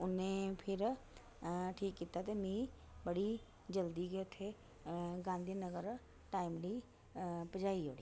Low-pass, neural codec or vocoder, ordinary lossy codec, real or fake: none; none; none; real